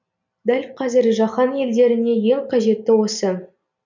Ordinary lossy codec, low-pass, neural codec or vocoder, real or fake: none; 7.2 kHz; none; real